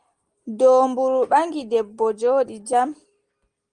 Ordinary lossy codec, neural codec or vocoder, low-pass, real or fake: Opus, 24 kbps; none; 9.9 kHz; real